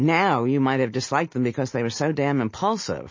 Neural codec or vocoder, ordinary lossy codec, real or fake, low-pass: none; MP3, 32 kbps; real; 7.2 kHz